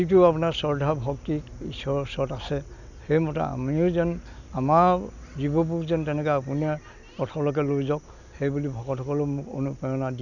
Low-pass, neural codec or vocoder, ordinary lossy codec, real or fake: 7.2 kHz; none; none; real